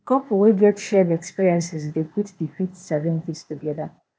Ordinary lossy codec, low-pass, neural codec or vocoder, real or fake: none; none; codec, 16 kHz, 0.8 kbps, ZipCodec; fake